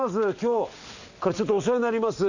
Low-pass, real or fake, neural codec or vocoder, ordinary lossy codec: 7.2 kHz; real; none; none